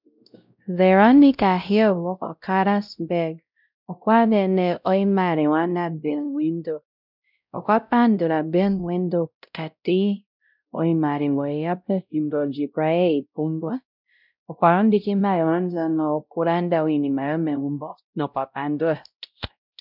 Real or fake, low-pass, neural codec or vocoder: fake; 5.4 kHz; codec, 16 kHz, 0.5 kbps, X-Codec, WavLM features, trained on Multilingual LibriSpeech